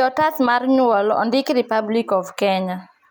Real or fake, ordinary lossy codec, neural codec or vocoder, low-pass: real; none; none; none